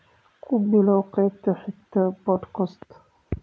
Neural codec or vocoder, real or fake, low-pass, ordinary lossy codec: none; real; none; none